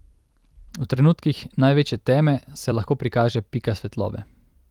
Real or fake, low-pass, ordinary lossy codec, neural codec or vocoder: fake; 19.8 kHz; Opus, 24 kbps; vocoder, 44.1 kHz, 128 mel bands every 256 samples, BigVGAN v2